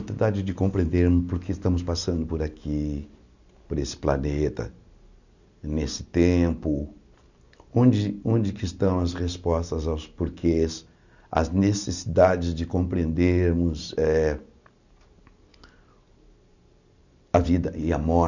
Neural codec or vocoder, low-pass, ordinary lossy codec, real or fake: none; 7.2 kHz; none; real